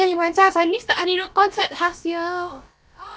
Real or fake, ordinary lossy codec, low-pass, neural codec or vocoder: fake; none; none; codec, 16 kHz, about 1 kbps, DyCAST, with the encoder's durations